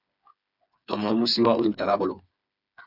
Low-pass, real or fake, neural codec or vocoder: 5.4 kHz; fake; codec, 16 kHz, 4 kbps, FreqCodec, smaller model